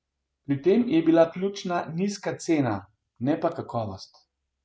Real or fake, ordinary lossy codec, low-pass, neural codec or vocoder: real; none; none; none